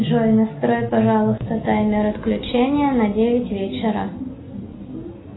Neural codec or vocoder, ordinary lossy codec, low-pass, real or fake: none; AAC, 16 kbps; 7.2 kHz; real